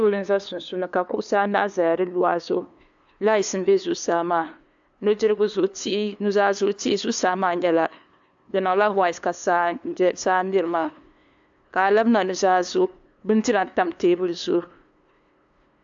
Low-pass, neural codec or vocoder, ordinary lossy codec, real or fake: 7.2 kHz; codec, 16 kHz, 2 kbps, FunCodec, trained on LibriTTS, 25 frames a second; AAC, 64 kbps; fake